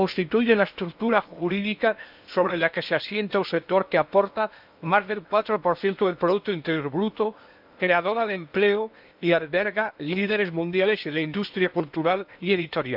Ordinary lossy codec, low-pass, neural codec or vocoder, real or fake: none; 5.4 kHz; codec, 16 kHz in and 24 kHz out, 0.8 kbps, FocalCodec, streaming, 65536 codes; fake